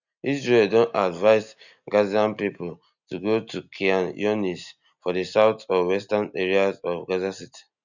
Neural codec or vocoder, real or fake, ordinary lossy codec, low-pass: none; real; none; 7.2 kHz